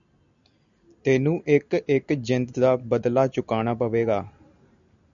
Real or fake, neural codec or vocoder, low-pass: real; none; 7.2 kHz